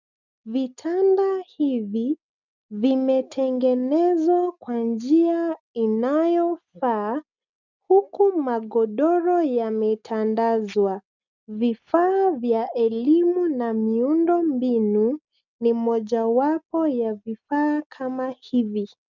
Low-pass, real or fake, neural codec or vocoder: 7.2 kHz; real; none